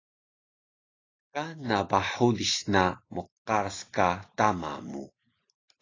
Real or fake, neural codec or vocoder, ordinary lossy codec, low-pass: real; none; AAC, 32 kbps; 7.2 kHz